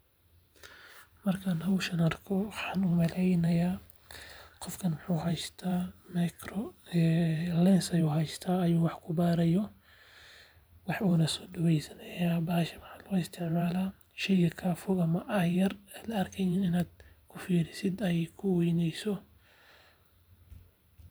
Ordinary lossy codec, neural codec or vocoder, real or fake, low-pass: none; vocoder, 44.1 kHz, 128 mel bands every 512 samples, BigVGAN v2; fake; none